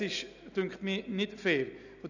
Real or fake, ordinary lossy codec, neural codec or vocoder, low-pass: real; none; none; 7.2 kHz